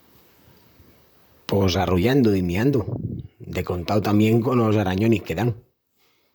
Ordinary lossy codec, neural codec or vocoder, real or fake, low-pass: none; vocoder, 44.1 kHz, 128 mel bands, Pupu-Vocoder; fake; none